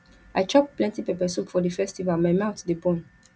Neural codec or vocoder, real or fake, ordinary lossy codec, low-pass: none; real; none; none